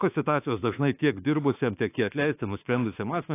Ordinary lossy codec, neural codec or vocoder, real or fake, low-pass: AAC, 24 kbps; autoencoder, 48 kHz, 32 numbers a frame, DAC-VAE, trained on Japanese speech; fake; 3.6 kHz